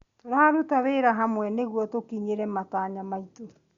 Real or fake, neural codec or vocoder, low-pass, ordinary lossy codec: real; none; 7.2 kHz; Opus, 64 kbps